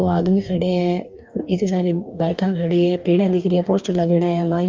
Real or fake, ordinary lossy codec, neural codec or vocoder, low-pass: fake; Opus, 32 kbps; codec, 44.1 kHz, 2.6 kbps, DAC; 7.2 kHz